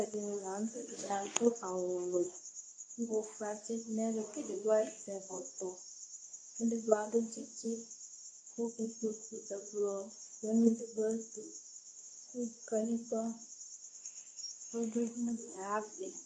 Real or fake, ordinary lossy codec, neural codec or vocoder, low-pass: fake; AAC, 48 kbps; codec, 24 kHz, 0.9 kbps, WavTokenizer, medium speech release version 2; 9.9 kHz